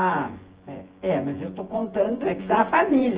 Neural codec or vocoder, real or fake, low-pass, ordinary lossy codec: vocoder, 24 kHz, 100 mel bands, Vocos; fake; 3.6 kHz; Opus, 16 kbps